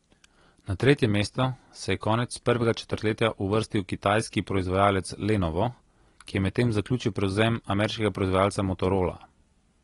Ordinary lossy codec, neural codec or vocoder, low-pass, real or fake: AAC, 32 kbps; none; 10.8 kHz; real